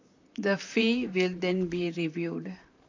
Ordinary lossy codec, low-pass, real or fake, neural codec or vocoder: AAC, 48 kbps; 7.2 kHz; fake; vocoder, 44.1 kHz, 128 mel bands, Pupu-Vocoder